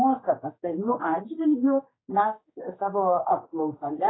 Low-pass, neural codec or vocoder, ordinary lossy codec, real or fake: 7.2 kHz; codec, 16 kHz, 2 kbps, X-Codec, HuBERT features, trained on general audio; AAC, 16 kbps; fake